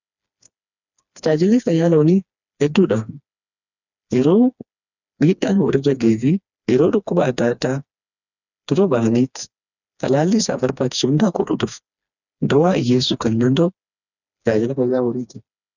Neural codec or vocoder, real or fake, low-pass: codec, 16 kHz, 2 kbps, FreqCodec, smaller model; fake; 7.2 kHz